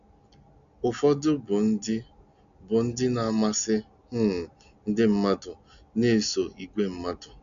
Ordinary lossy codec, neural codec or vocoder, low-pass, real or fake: none; none; 7.2 kHz; real